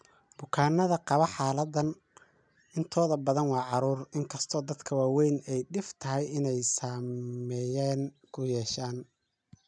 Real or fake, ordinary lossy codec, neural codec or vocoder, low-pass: real; none; none; 9.9 kHz